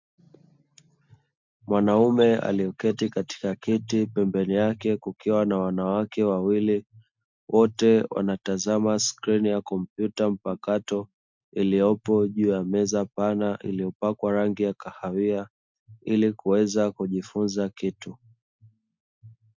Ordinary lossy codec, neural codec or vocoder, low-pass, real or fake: MP3, 64 kbps; none; 7.2 kHz; real